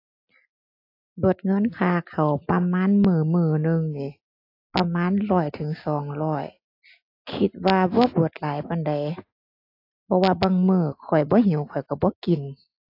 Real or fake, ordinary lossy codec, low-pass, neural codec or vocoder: real; AAC, 32 kbps; 5.4 kHz; none